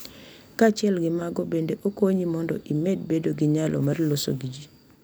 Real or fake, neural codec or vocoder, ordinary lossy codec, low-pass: real; none; none; none